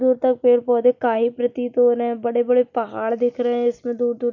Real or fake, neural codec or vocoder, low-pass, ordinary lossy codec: real; none; 7.2 kHz; none